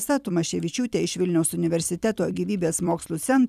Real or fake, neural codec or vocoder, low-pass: fake; vocoder, 44.1 kHz, 128 mel bands every 256 samples, BigVGAN v2; 14.4 kHz